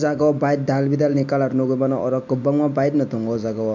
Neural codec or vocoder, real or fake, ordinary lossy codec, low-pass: none; real; MP3, 64 kbps; 7.2 kHz